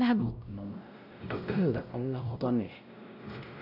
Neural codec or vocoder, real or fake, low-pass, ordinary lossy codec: codec, 16 kHz, 0.5 kbps, X-Codec, WavLM features, trained on Multilingual LibriSpeech; fake; 5.4 kHz; none